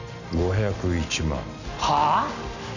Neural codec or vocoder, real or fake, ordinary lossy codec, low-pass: none; real; none; 7.2 kHz